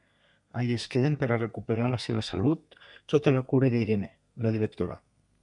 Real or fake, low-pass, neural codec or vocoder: fake; 10.8 kHz; codec, 32 kHz, 1.9 kbps, SNAC